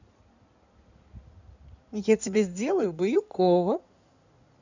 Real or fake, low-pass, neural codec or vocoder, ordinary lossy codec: fake; 7.2 kHz; codec, 16 kHz in and 24 kHz out, 2.2 kbps, FireRedTTS-2 codec; none